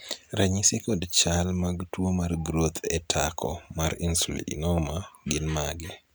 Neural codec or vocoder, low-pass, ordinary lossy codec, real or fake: none; none; none; real